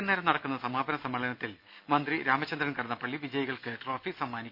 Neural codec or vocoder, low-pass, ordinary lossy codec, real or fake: none; 5.4 kHz; none; real